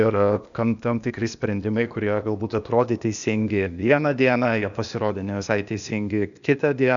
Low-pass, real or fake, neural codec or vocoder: 7.2 kHz; fake; codec, 16 kHz, 0.8 kbps, ZipCodec